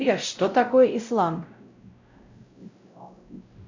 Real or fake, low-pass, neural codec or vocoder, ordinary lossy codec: fake; 7.2 kHz; codec, 16 kHz, 0.5 kbps, X-Codec, WavLM features, trained on Multilingual LibriSpeech; MP3, 64 kbps